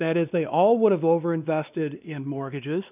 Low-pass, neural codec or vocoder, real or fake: 3.6 kHz; codec, 16 kHz, 2 kbps, X-Codec, WavLM features, trained on Multilingual LibriSpeech; fake